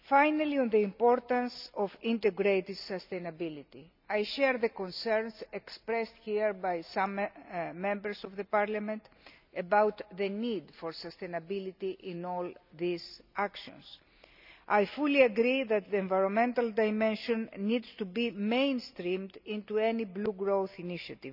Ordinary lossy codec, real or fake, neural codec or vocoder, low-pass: none; real; none; 5.4 kHz